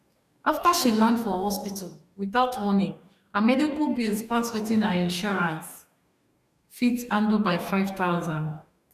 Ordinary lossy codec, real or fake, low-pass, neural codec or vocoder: none; fake; 14.4 kHz; codec, 44.1 kHz, 2.6 kbps, DAC